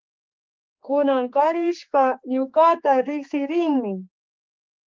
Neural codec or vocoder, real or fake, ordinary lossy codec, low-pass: codec, 16 kHz, 4 kbps, X-Codec, HuBERT features, trained on general audio; fake; Opus, 32 kbps; 7.2 kHz